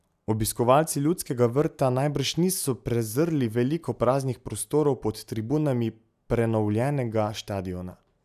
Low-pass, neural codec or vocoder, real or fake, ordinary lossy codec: 14.4 kHz; none; real; none